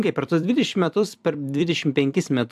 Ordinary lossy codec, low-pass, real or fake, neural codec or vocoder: AAC, 96 kbps; 14.4 kHz; fake; vocoder, 44.1 kHz, 128 mel bands every 256 samples, BigVGAN v2